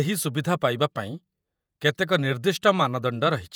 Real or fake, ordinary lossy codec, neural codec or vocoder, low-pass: fake; none; vocoder, 48 kHz, 128 mel bands, Vocos; none